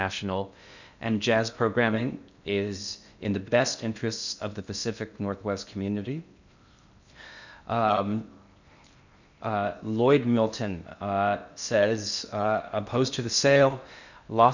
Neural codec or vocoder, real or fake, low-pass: codec, 16 kHz in and 24 kHz out, 0.6 kbps, FocalCodec, streaming, 2048 codes; fake; 7.2 kHz